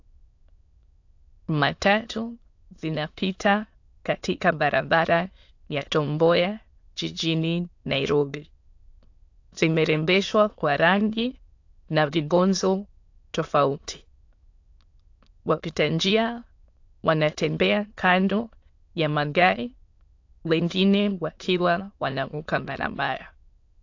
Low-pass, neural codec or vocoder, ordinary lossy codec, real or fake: 7.2 kHz; autoencoder, 22.05 kHz, a latent of 192 numbers a frame, VITS, trained on many speakers; AAC, 48 kbps; fake